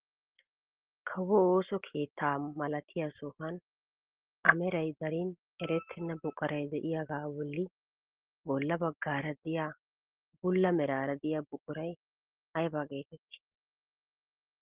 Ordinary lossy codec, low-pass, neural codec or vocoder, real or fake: Opus, 16 kbps; 3.6 kHz; none; real